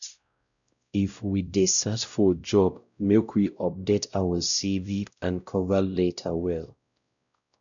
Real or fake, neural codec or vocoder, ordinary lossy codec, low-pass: fake; codec, 16 kHz, 0.5 kbps, X-Codec, WavLM features, trained on Multilingual LibriSpeech; none; 7.2 kHz